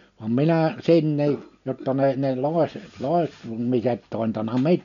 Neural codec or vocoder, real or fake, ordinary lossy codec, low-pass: none; real; none; 7.2 kHz